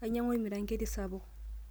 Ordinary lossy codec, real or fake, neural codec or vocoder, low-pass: none; real; none; none